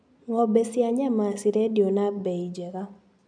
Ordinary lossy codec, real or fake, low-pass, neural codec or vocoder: none; real; 9.9 kHz; none